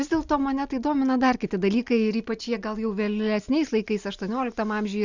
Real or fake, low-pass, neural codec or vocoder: real; 7.2 kHz; none